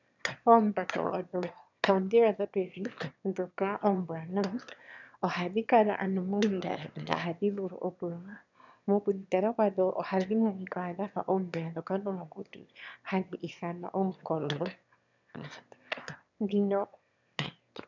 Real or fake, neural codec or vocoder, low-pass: fake; autoencoder, 22.05 kHz, a latent of 192 numbers a frame, VITS, trained on one speaker; 7.2 kHz